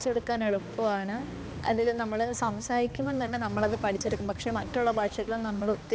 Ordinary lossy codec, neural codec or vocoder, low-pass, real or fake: none; codec, 16 kHz, 2 kbps, X-Codec, HuBERT features, trained on balanced general audio; none; fake